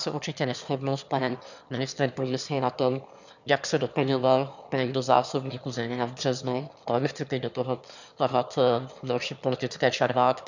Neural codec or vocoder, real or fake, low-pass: autoencoder, 22.05 kHz, a latent of 192 numbers a frame, VITS, trained on one speaker; fake; 7.2 kHz